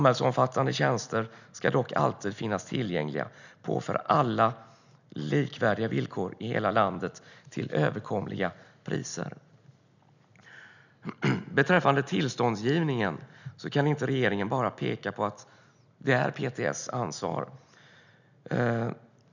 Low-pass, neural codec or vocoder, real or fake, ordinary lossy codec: 7.2 kHz; none; real; none